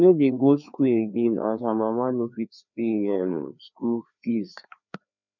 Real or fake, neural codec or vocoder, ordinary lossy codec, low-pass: fake; codec, 16 kHz, 2 kbps, FreqCodec, larger model; none; 7.2 kHz